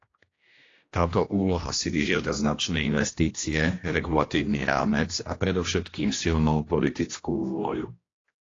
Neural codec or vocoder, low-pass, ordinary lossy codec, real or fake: codec, 16 kHz, 1 kbps, X-Codec, HuBERT features, trained on general audio; 7.2 kHz; AAC, 32 kbps; fake